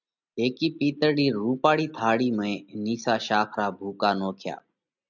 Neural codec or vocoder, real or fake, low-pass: none; real; 7.2 kHz